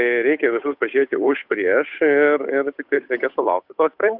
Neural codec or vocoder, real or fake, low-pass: codec, 16 kHz, 8 kbps, FunCodec, trained on Chinese and English, 25 frames a second; fake; 5.4 kHz